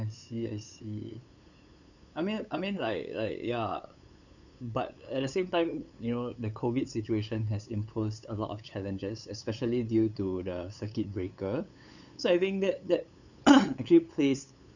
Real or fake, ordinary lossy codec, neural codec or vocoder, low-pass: fake; none; codec, 16 kHz, 8 kbps, FunCodec, trained on LibriTTS, 25 frames a second; 7.2 kHz